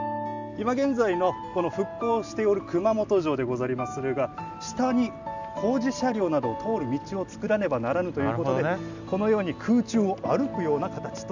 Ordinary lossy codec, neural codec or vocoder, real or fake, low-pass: none; none; real; 7.2 kHz